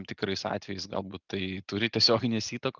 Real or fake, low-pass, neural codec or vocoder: real; 7.2 kHz; none